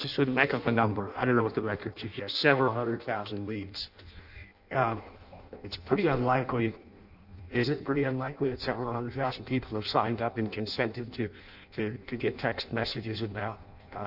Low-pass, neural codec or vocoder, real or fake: 5.4 kHz; codec, 16 kHz in and 24 kHz out, 0.6 kbps, FireRedTTS-2 codec; fake